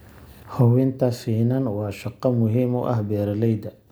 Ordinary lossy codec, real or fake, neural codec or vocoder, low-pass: none; real; none; none